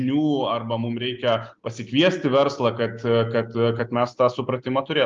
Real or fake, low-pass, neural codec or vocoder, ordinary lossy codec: real; 7.2 kHz; none; Opus, 32 kbps